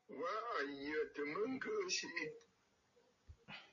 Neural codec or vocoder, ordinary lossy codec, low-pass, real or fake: none; MP3, 32 kbps; 7.2 kHz; real